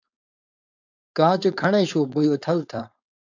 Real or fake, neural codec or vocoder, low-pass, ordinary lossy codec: fake; codec, 16 kHz, 4.8 kbps, FACodec; 7.2 kHz; AAC, 48 kbps